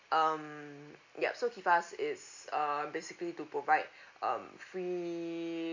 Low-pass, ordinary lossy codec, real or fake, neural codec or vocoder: 7.2 kHz; MP3, 48 kbps; real; none